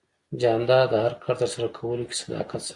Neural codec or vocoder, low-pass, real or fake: none; 10.8 kHz; real